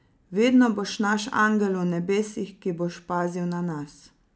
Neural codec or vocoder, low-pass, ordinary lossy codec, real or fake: none; none; none; real